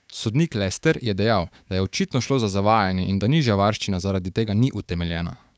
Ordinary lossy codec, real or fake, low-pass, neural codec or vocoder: none; fake; none; codec, 16 kHz, 6 kbps, DAC